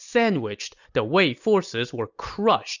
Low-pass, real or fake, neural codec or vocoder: 7.2 kHz; real; none